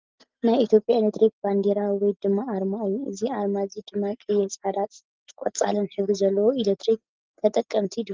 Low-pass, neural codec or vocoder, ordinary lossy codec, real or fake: 7.2 kHz; none; Opus, 32 kbps; real